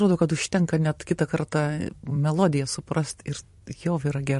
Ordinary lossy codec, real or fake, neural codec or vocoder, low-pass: MP3, 48 kbps; fake; vocoder, 44.1 kHz, 128 mel bands every 256 samples, BigVGAN v2; 14.4 kHz